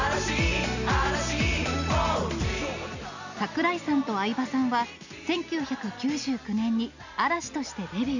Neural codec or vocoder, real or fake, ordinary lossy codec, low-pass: none; real; none; 7.2 kHz